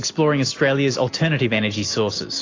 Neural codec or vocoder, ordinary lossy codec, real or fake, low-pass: none; AAC, 32 kbps; real; 7.2 kHz